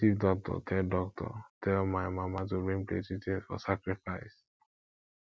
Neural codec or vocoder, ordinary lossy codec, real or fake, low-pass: none; none; real; none